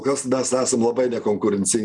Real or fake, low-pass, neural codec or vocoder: real; 10.8 kHz; none